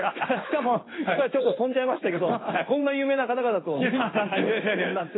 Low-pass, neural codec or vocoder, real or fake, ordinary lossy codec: 7.2 kHz; codec, 16 kHz in and 24 kHz out, 1 kbps, XY-Tokenizer; fake; AAC, 16 kbps